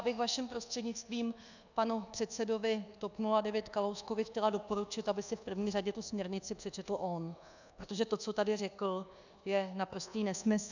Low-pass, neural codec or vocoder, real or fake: 7.2 kHz; codec, 24 kHz, 1.2 kbps, DualCodec; fake